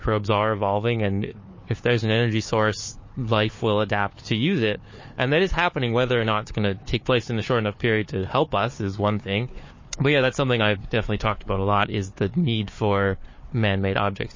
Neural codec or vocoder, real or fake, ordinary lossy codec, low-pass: codec, 16 kHz, 4 kbps, FunCodec, trained on Chinese and English, 50 frames a second; fake; MP3, 32 kbps; 7.2 kHz